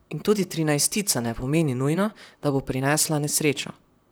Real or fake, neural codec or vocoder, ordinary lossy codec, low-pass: fake; vocoder, 44.1 kHz, 128 mel bands, Pupu-Vocoder; none; none